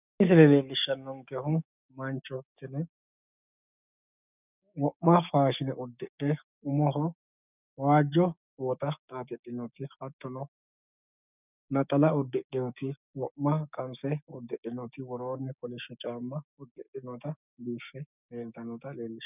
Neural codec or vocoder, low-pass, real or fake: codec, 16 kHz, 6 kbps, DAC; 3.6 kHz; fake